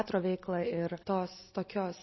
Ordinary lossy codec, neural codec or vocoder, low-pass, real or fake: MP3, 24 kbps; none; 7.2 kHz; real